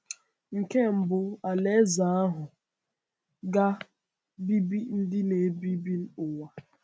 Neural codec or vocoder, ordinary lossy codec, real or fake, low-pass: none; none; real; none